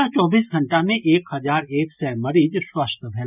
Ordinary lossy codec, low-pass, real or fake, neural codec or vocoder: none; 3.6 kHz; real; none